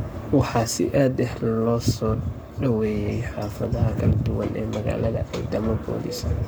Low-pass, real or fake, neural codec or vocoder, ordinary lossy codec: none; fake; codec, 44.1 kHz, 7.8 kbps, Pupu-Codec; none